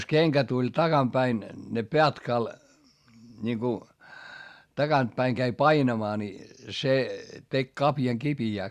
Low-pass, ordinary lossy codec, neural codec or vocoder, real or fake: 14.4 kHz; Opus, 64 kbps; none; real